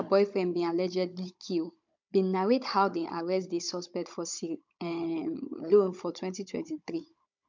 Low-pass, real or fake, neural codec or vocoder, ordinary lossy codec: 7.2 kHz; fake; codec, 16 kHz, 16 kbps, FunCodec, trained on Chinese and English, 50 frames a second; MP3, 64 kbps